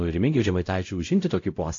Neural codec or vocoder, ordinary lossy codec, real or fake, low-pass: codec, 16 kHz, 0.5 kbps, X-Codec, WavLM features, trained on Multilingual LibriSpeech; AAC, 48 kbps; fake; 7.2 kHz